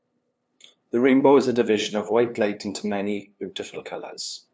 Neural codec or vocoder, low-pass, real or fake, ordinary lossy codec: codec, 16 kHz, 2 kbps, FunCodec, trained on LibriTTS, 25 frames a second; none; fake; none